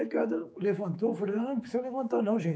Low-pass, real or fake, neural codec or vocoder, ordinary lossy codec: none; fake; codec, 16 kHz, 4 kbps, X-Codec, HuBERT features, trained on general audio; none